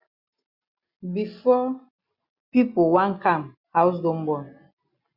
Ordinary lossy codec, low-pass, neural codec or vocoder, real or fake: Opus, 64 kbps; 5.4 kHz; none; real